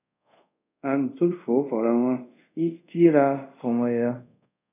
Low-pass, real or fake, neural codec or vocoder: 3.6 kHz; fake; codec, 24 kHz, 0.5 kbps, DualCodec